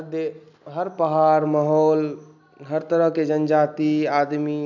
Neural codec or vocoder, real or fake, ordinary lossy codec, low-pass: none; real; none; 7.2 kHz